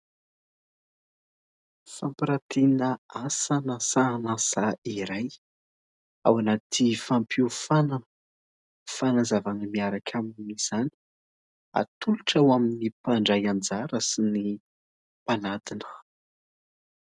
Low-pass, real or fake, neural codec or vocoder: 10.8 kHz; real; none